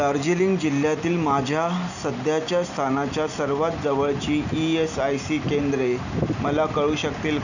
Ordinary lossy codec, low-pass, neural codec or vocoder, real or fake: none; 7.2 kHz; none; real